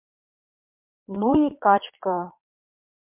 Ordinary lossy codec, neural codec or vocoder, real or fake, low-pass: MP3, 32 kbps; vocoder, 22.05 kHz, 80 mel bands, Vocos; fake; 3.6 kHz